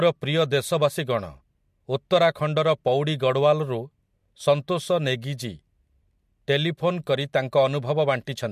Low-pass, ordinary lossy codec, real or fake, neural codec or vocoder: 14.4 kHz; MP3, 64 kbps; real; none